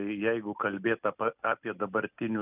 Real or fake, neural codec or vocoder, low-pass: real; none; 3.6 kHz